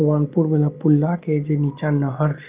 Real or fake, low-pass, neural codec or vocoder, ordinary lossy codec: real; 3.6 kHz; none; Opus, 32 kbps